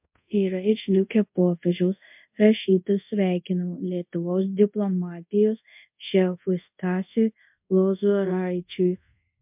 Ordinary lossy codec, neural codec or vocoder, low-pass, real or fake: MP3, 32 kbps; codec, 24 kHz, 0.5 kbps, DualCodec; 3.6 kHz; fake